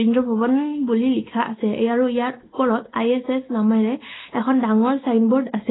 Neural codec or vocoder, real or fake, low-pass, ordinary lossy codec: none; real; 7.2 kHz; AAC, 16 kbps